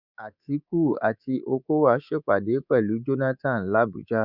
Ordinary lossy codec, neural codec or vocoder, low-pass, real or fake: none; codec, 24 kHz, 3.1 kbps, DualCodec; 5.4 kHz; fake